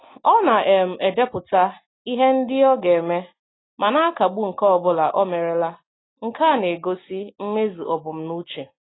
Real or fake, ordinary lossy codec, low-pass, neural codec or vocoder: real; AAC, 16 kbps; 7.2 kHz; none